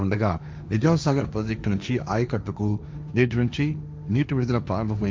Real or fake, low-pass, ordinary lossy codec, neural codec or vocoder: fake; none; none; codec, 16 kHz, 1.1 kbps, Voila-Tokenizer